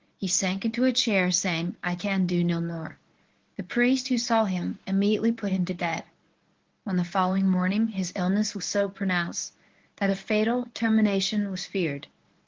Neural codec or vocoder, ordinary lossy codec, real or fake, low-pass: codec, 24 kHz, 0.9 kbps, WavTokenizer, medium speech release version 1; Opus, 16 kbps; fake; 7.2 kHz